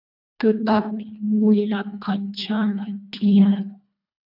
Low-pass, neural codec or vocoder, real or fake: 5.4 kHz; codec, 24 kHz, 1.5 kbps, HILCodec; fake